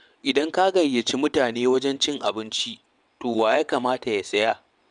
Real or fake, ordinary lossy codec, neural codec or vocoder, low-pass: fake; none; vocoder, 22.05 kHz, 80 mel bands, WaveNeXt; 9.9 kHz